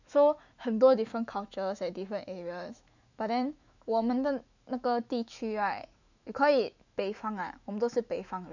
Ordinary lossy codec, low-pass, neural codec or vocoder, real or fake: none; 7.2 kHz; autoencoder, 48 kHz, 128 numbers a frame, DAC-VAE, trained on Japanese speech; fake